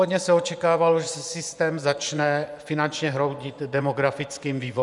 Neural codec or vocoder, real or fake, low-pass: vocoder, 24 kHz, 100 mel bands, Vocos; fake; 10.8 kHz